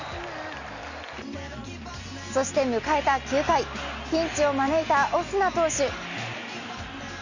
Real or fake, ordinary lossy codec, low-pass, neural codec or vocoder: real; none; 7.2 kHz; none